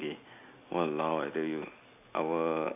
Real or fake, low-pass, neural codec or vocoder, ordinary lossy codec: real; 3.6 kHz; none; none